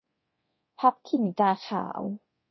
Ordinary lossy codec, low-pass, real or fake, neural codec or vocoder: MP3, 24 kbps; 7.2 kHz; fake; codec, 24 kHz, 0.9 kbps, DualCodec